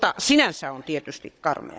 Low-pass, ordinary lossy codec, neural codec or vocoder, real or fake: none; none; codec, 16 kHz, 16 kbps, FunCodec, trained on Chinese and English, 50 frames a second; fake